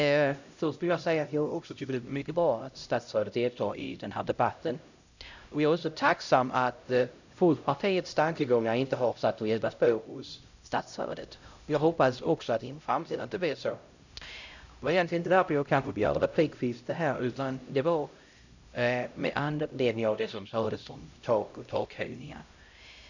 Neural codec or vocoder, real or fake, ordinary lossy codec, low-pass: codec, 16 kHz, 0.5 kbps, X-Codec, HuBERT features, trained on LibriSpeech; fake; none; 7.2 kHz